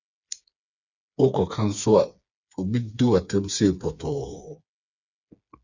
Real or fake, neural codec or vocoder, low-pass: fake; codec, 16 kHz, 4 kbps, FreqCodec, smaller model; 7.2 kHz